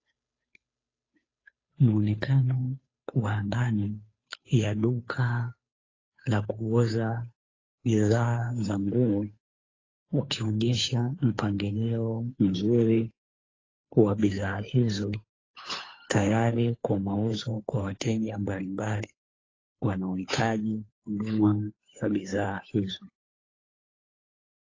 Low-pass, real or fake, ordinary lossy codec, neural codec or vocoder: 7.2 kHz; fake; AAC, 32 kbps; codec, 16 kHz, 2 kbps, FunCodec, trained on Chinese and English, 25 frames a second